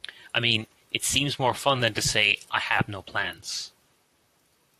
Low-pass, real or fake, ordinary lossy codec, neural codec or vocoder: 14.4 kHz; fake; AAC, 64 kbps; vocoder, 44.1 kHz, 128 mel bands, Pupu-Vocoder